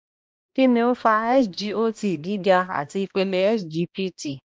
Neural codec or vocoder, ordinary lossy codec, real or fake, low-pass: codec, 16 kHz, 1 kbps, X-Codec, HuBERT features, trained on balanced general audio; none; fake; none